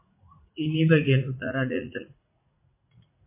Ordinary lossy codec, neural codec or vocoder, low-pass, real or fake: MP3, 24 kbps; vocoder, 44.1 kHz, 80 mel bands, Vocos; 3.6 kHz; fake